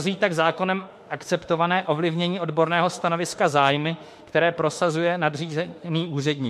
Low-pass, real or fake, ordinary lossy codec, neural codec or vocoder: 14.4 kHz; fake; MP3, 64 kbps; autoencoder, 48 kHz, 32 numbers a frame, DAC-VAE, trained on Japanese speech